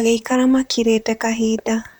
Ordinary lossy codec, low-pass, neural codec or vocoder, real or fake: none; none; vocoder, 44.1 kHz, 128 mel bands, Pupu-Vocoder; fake